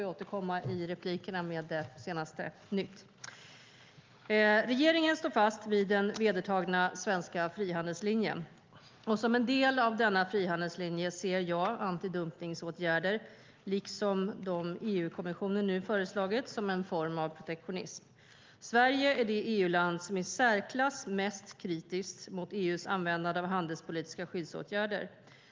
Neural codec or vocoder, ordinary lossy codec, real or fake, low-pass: none; Opus, 32 kbps; real; 7.2 kHz